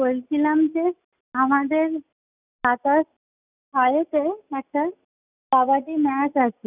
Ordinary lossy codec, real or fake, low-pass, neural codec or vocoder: none; real; 3.6 kHz; none